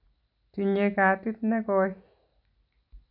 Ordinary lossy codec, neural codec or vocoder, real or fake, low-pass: none; none; real; 5.4 kHz